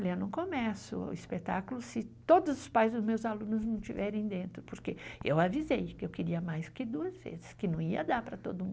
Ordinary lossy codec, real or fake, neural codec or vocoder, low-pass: none; real; none; none